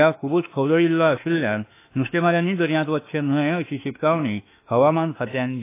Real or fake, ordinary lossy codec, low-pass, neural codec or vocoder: fake; AAC, 24 kbps; 3.6 kHz; autoencoder, 48 kHz, 32 numbers a frame, DAC-VAE, trained on Japanese speech